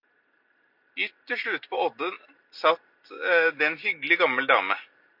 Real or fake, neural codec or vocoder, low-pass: real; none; 5.4 kHz